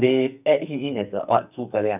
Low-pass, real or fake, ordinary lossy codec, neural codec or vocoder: 3.6 kHz; fake; none; codec, 44.1 kHz, 2.6 kbps, SNAC